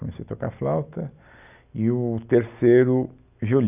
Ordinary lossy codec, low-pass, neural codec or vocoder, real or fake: none; 3.6 kHz; none; real